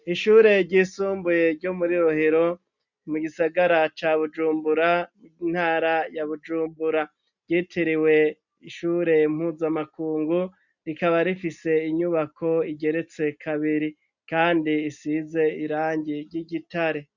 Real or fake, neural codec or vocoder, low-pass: real; none; 7.2 kHz